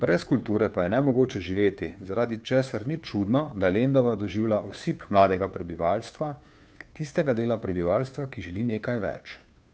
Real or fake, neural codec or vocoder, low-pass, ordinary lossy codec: fake; codec, 16 kHz, 2 kbps, FunCodec, trained on Chinese and English, 25 frames a second; none; none